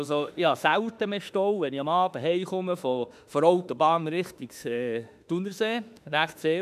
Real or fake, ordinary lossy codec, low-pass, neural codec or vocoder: fake; none; 14.4 kHz; autoencoder, 48 kHz, 32 numbers a frame, DAC-VAE, trained on Japanese speech